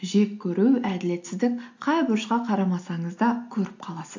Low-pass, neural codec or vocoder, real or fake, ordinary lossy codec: 7.2 kHz; none; real; AAC, 48 kbps